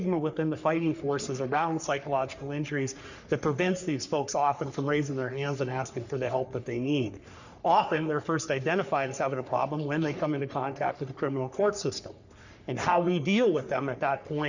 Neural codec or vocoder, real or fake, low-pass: codec, 44.1 kHz, 3.4 kbps, Pupu-Codec; fake; 7.2 kHz